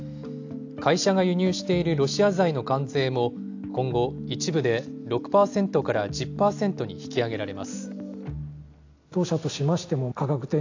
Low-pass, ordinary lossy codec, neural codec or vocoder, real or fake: 7.2 kHz; AAC, 48 kbps; none; real